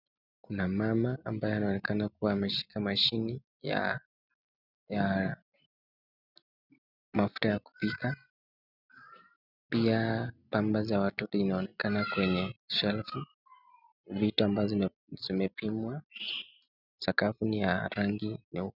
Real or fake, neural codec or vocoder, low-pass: real; none; 5.4 kHz